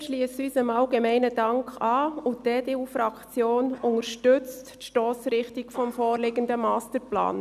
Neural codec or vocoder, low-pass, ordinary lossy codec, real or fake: none; 14.4 kHz; none; real